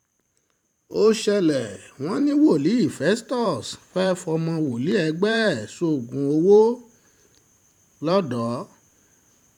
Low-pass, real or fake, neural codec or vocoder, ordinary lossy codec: 19.8 kHz; fake; vocoder, 44.1 kHz, 128 mel bands every 512 samples, BigVGAN v2; none